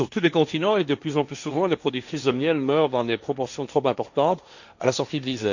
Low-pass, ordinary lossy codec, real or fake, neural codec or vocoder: 7.2 kHz; none; fake; codec, 16 kHz, 1.1 kbps, Voila-Tokenizer